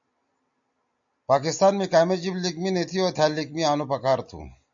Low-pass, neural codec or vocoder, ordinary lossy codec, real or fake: 7.2 kHz; none; MP3, 64 kbps; real